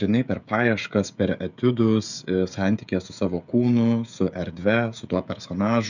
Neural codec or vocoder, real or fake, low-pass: codec, 16 kHz, 16 kbps, FreqCodec, smaller model; fake; 7.2 kHz